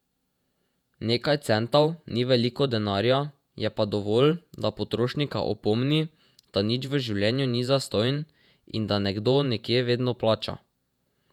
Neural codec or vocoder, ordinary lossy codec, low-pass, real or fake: vocoder, 48 kHz, 128 mel bands, Vocos; none; 19.8 kHz; fake